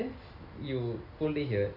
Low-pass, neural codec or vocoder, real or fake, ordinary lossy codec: 5.4 kHz; none; real; none